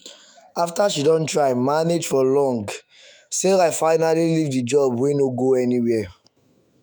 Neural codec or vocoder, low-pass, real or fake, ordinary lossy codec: autoencoder, 48 kHz, 128 numbers a frame, DAC-VAE, trained on Japanese speech; none; fake; none